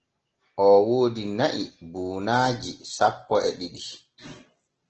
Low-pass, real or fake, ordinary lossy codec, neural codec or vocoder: 7.2 kHz; real; Opus, 16 kbps; none